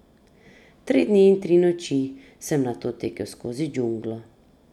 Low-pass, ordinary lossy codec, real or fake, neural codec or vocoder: 19.8 kHz; none; real; none